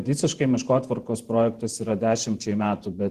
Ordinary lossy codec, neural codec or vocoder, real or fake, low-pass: Opus, 16 kbps; vocoder, 48 kHz, 128 mel bands, Vocos; fake; 14.4 kHz